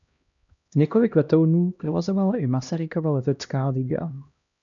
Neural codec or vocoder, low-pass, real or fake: codec, 16 kHz, 1 kbps, X-Codec, HuBERT features, trained on LibriSpeech; 7.2 kHz; fake